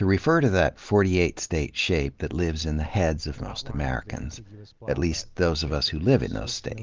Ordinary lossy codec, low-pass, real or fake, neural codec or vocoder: Opus, 32 kbps; 7.2 kHz; real; none